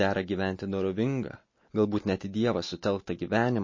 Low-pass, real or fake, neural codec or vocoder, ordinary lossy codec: 7.2 kHz; real; none; MP3, 32 kbps